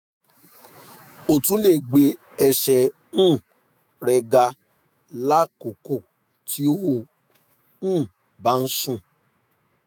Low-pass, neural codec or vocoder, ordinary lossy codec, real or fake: none; autoencoder, 48 kHz, 128 numbers a frame, DAC-VAE, trained on Japanese speech; none; fake